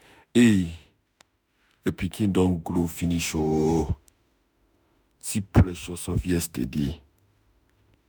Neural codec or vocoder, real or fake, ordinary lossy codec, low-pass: autoencoder, 48 kHz, 32 numbers a frame, DAC-VAE, trained on Japanese speech; fake; none; none